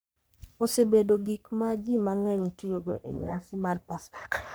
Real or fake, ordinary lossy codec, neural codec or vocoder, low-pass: fake; none; codec, 44.1 kHz, 3.4 kbps, Pupu-Codec; none